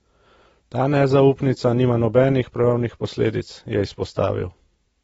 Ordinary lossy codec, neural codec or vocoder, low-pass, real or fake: AAC, 24 kbps; none; 19.8 kHz; real